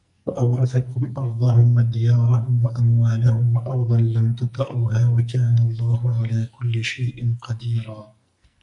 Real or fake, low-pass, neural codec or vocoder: fake; 10.8 kHz; codec, 44.1 kHz, 2.6 kbps, SNAC